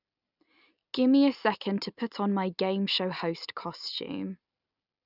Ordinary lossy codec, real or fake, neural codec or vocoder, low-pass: none; real; none; 5.4 kHz